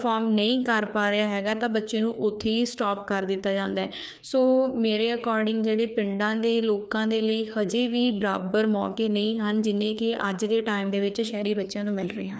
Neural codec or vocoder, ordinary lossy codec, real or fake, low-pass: codec, 16 kHz, 2 kbps, FreqCodec, larger model; none; fake; none